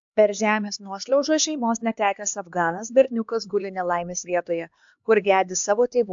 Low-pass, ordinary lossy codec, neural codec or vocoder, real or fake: 7.2 kHz; AAC, 64 kbps; codec, 16 kHz, 2 kbps, X-Codec, HuBERT features, trained on LibriSpeech; fake